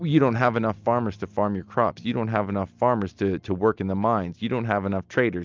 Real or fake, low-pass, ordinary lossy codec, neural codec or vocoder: real; 7.2 kHz; Opus, 24 kbps; none